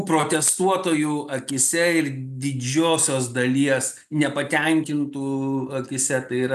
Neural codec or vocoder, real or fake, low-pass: none; real; 14.4 kHz